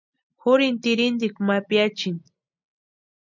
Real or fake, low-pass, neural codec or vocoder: real; 7.2 kHz; none